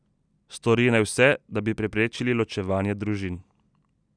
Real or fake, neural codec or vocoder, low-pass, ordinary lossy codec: real; none; 9.9 kHz; none